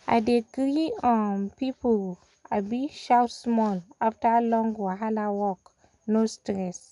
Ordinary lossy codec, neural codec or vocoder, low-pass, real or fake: none; none; 10.8 kHz; real